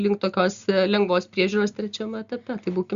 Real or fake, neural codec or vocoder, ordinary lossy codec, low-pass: real; none; Opus, 64 kbps; 7.2 kHz